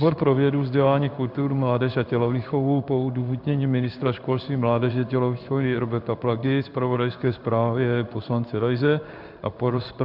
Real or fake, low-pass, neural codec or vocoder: fake; 5.4 kHz; codec, 16 kHz in and 24 kHz out, 1 kbps, XY-Tokenizer